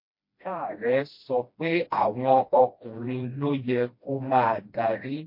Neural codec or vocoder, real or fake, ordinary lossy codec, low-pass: codec, 16 kHz, 1 kbps, FreqCodec, smaller model; fake; MP3, 32 kbps; 5.4 kHz